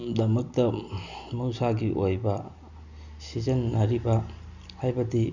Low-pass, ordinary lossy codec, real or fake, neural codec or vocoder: 7.2 kHz; none; real; none